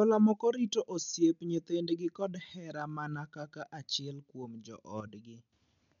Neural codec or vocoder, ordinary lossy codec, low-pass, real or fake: none; MP3, 64 kbps; 7.2 kHz; real